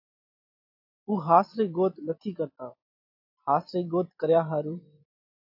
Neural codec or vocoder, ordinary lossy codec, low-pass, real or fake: vocoder, 44.1 kHz, 128 mel bands every 256 samples, BigVGAN v2; AAC, 48 kbps; 5.4 kHz; fake